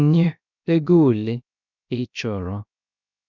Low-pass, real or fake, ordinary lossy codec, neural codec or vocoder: 7.2 kHz; fake; none; codec, 16 kHz, 0.3 kbps, FocalCodec